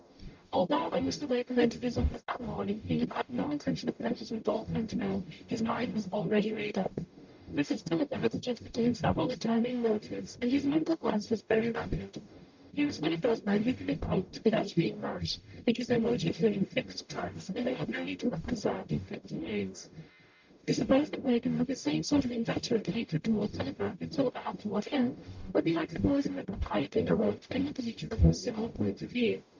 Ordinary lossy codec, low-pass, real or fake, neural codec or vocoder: MP3, 64 kbps; 7.2 kHz; fake; codec, 44.1 kHz, 0.9 kbps, DAC